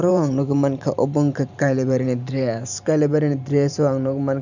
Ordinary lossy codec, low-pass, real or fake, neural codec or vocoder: none; 7.2 kHz; fake; vocoder, 44.1 kHz, 128 mel bands every 512 samples, BigVGAN v2